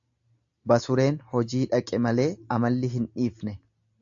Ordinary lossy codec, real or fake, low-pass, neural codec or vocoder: AAC, 64 kbps; real; 7.2 kHz; none